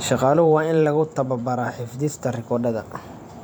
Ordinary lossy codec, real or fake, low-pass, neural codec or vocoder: none; fake; none; vocoder, 44.1 kHz, 128 mel bands every 512 samples, BigVGAN v2